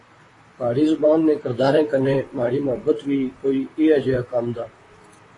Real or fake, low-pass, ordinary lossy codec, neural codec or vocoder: fake; 10.8 kHz; AAC, 32 kbps; vocoder, 44.1 kHz, 128 mel bands, Pupu-Vocoder